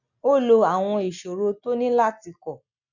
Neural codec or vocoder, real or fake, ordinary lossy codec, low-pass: none; real; none; 7.2 kHz